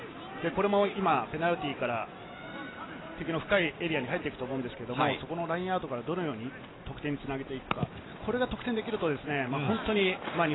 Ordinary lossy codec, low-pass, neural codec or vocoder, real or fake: AAC, 16 kbps; 7.2 kHz; none; real